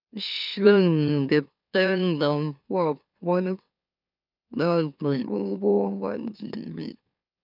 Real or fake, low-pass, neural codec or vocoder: fake; 5.4 kHz; autoencoder, 44.1 kHz, a latent of 192 numbers a frame, MeloTTS